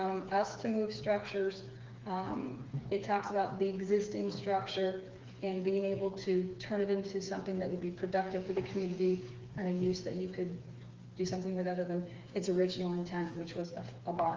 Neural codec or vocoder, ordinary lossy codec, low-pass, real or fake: codec, 16 kHz, 4 kbps, FreqCodec, smaller model; Opus, 32 kbps; 7.2 kHz; fake